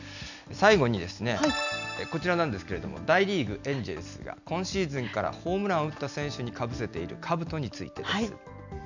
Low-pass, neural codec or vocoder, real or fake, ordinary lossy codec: 7.2 kHz; none; real; none